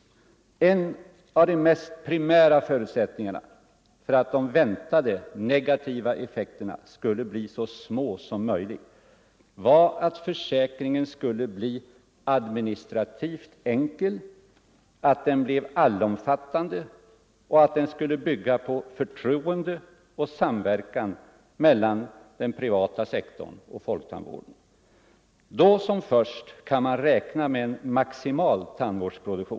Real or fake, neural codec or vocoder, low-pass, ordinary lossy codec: real; none; none; none